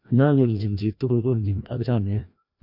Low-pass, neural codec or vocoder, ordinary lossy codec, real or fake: 5.4 kHz; codec, 16 kHz, 1 kbps, FreqCodec, larger model; none; fake